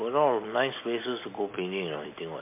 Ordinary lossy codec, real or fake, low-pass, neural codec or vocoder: MP3, 24 kbps; real; 3.6 kHz; none